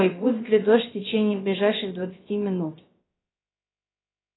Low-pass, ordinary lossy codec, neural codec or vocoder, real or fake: 7.2 kHz; AAC, 16 kbps; codec, 16 kHz, about 1 kbps, DyCAST, with the encoder's durations; fake